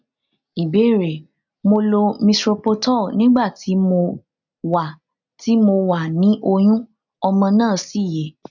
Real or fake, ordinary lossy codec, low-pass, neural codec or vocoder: real; none; 7.2 kHz; none